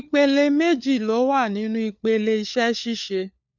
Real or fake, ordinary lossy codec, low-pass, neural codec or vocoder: fake; none; 7.2 kHz; codec, 16 kHz, 4 kbps, FunCodec, trained on LibriTTS, 50 frames a second